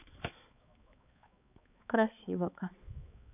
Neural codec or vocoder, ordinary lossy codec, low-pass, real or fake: codec, 16 kHz, 4 kbps, X-Codec, HuBERT features, trained on balanced general audio; none; 3.6 kHz; fake